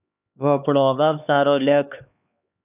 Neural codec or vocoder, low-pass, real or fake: codec, 16 kHz, 2 kbps, X-Codec, HuBERT features, trained on LibriSpeech; 3.6 kHz; fake